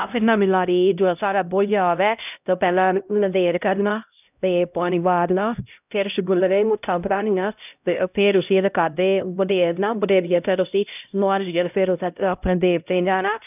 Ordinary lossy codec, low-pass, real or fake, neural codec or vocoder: none; 3.6 kHz; fake; codec, 16 kHz, 0.5 kbps, X-Codec, HuBERT features, trained on LibriSpeech